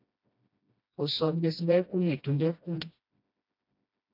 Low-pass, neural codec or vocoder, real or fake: 5.4 kHz; codec, 16 kHz, 1 kbps, FreqCodec, smaller model; fake